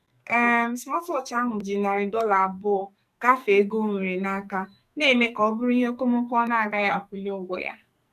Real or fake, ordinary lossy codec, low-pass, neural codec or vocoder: fake; none; 14.4 kHz; codec, 44.1 kHz, 2.6 kbps, SNAC